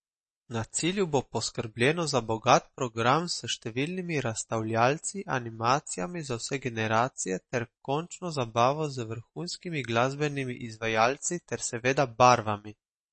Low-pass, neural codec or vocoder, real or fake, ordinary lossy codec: 10.8 kHz; none; real; MP3, 32 kbps